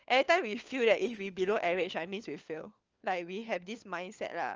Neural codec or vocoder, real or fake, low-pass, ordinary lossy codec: codec, 16 kHz, 4 kbps, FunCodec, trained on LibriTTS, 50 frames a second; fake; 7.2 kHz; Opus, 24 kbps